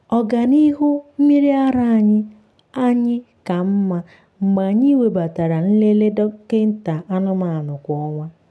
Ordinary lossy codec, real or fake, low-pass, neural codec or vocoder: none; real; none; none